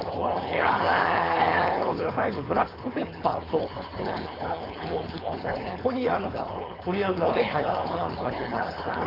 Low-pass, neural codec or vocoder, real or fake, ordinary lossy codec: 5.4 kHz; codec, 16 kHz, 4.8 kbps, FACodec; fake; AAC, 24 kbps